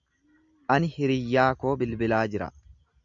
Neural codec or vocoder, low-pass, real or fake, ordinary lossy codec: none; 7.2 kHz; real; MP3, 64 kbps